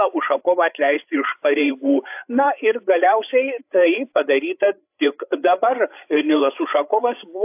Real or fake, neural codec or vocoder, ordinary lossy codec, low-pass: fake; codec, 16 kHz, 8 kbps, FreqCodec, larger model; AAC, 32 kbps; 3.6 kHz